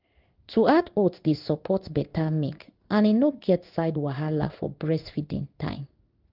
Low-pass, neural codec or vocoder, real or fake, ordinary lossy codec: 5.4 kHz; codec, 16 kHz in and 24 kHz out, 1 kbps, XY-Tokenizer; fake; Opus, 32 kbps